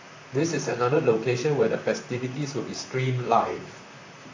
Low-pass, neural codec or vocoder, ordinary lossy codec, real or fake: 7.2 kHz; vocoder, 44.1 kHz, 128 mel bands, Pupu-Vocoder; AAC, 48 kbps; fake